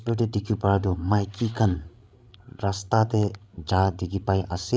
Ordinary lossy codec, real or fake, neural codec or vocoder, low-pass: none; fake; codec, 16 kHz, 16 kbps, FreqCodec, larger model; none